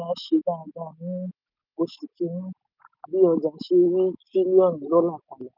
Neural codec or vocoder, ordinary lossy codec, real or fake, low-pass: none; none; real; 5.4 kHz